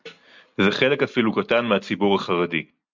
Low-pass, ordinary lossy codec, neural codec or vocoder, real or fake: 7.2 kHz; MP3, 64 kbps; none; real